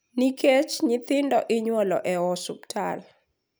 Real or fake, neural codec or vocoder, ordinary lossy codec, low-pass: real; none; none; none